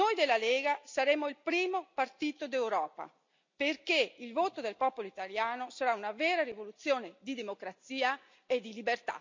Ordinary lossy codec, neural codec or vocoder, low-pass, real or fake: MP3, 64 kbps; none; 7.2 kHz; real